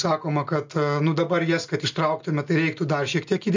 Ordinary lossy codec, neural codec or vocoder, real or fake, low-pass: MP3, 48 kbps; none; real; 7.2 kHz